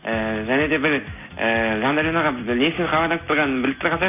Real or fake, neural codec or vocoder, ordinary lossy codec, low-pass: real; none; none; 3.6 kHz